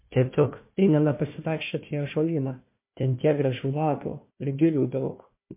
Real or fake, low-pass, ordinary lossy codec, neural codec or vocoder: fake; 3.6 kHz; MP3, 24 kbps; codec, 16 kHz, 1 kbps, FunCodec, trained on Chinese and English, 50 frames a second